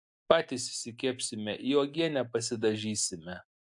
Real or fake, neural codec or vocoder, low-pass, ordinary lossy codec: real; none; 10.8 kHz; AAC, 64 kbps